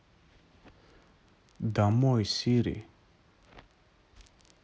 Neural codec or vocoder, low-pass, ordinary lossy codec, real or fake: none; none; none; real